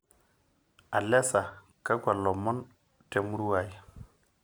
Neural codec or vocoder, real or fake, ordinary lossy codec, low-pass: none; real; none; none